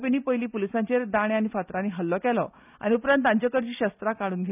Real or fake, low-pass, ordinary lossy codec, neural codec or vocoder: real; 3.6 kHz; none; none